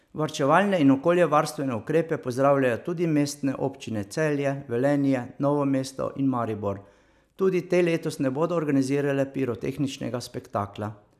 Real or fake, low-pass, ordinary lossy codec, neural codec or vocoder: real; 14.4 kHz; none; none